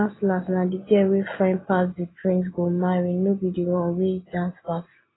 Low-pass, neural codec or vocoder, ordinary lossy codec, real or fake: 7.2 kHz; none; AAC, 16 kbps; real